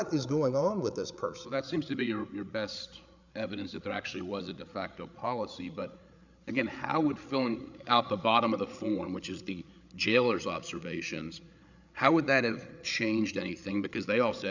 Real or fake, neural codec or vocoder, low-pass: fake; codec, 16 kHz, 8 kbps, FreqCodec, larger model; 7.2 kHz